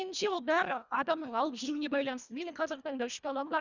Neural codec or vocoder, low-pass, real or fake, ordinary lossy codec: codec, 24 kHz, 1.5 kbps, HILCodec; 7.2 kHz; fake; none